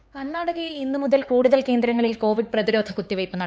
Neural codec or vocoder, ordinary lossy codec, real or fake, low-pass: codec, 16 kHz, 4 kbps, X-Codec, HuBERT features, trained on LibriSpeech; none; fake; none